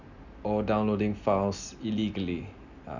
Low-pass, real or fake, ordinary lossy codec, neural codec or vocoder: 7.2 kHz; real; none; none